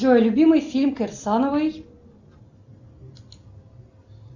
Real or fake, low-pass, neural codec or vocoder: real; 7.2 kHz; none